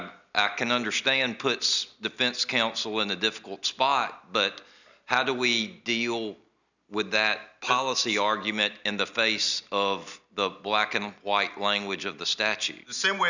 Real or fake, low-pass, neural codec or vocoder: real; 7.2 kHz; none